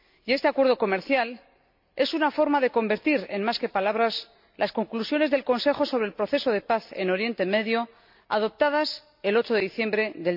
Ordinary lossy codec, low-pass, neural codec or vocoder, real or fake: MP3, 48 kbps; 5.4 kHz; none; real